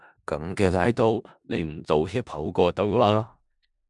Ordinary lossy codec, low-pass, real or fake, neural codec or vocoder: MP3, 96 kbps; 10.8 kHz; fake; codec, 16 kHz in and 24 kHz out, 0.4 kbps, LongCat-Audio-Codec, four codebook decoder